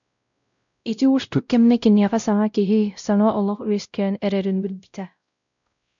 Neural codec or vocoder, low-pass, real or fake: codec, 16 kHz, 0.5 kbps, X-Codec, WavLM features, trained on Multilingual LibriSpeech; 7.2 kHz; fake